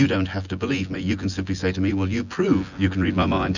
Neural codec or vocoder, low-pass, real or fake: vocoder, 24 kHz, 100 mel bands, Vocos; 7.2 kHz; fake